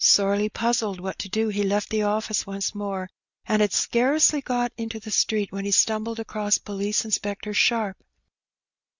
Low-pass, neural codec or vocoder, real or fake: 7.2 kHz; none; real